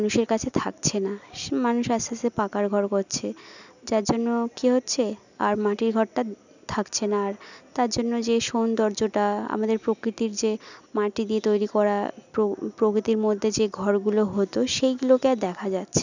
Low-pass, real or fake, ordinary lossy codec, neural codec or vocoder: 7.2 kHz; real; none; none